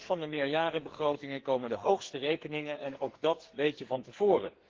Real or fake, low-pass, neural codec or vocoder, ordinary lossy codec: fake; 7.2 kHz; codec, 44.1 kHz, 2.6 kbps, SNAC; Opus, 16 kbps